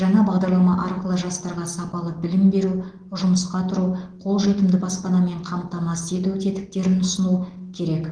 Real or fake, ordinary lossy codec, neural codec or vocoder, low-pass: real; Opus, 16 kbps; none; 9.9 kHz